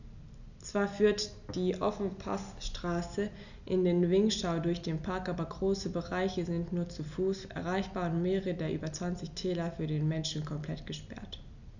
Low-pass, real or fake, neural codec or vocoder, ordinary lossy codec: 7.2 kHz; real; none; none